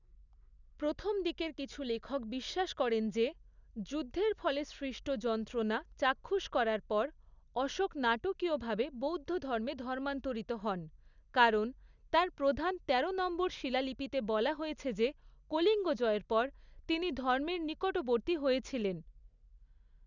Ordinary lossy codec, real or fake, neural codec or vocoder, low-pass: none; real; none; 7.2 kHz